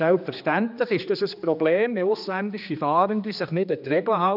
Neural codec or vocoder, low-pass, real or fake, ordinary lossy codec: codec, 16 kHz, 2 kbps, X-Codec, HuBERT features, trained on general audio; 5.4 kHz; fake; none